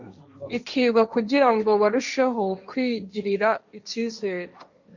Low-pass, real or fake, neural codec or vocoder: 7.2 kHz; fake; codec, 16 kHz, 1.1 kbps, Voila-Tokenizer